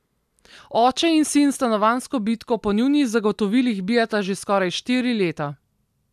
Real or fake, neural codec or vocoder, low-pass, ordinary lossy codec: real; none; 14.4 kHz; none